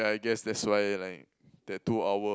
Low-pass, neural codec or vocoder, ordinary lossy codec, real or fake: none; none; none; real